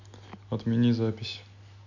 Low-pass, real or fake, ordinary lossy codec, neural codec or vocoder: 7.2 kHz; real; none; none